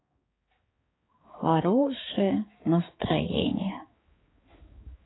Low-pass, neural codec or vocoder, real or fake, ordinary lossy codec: 7.2 kHz; codec, 16 kHz, 4 kbps, X-Codec, HuBERT features, trained on balanced general audio; fake; AAC, 16 kbps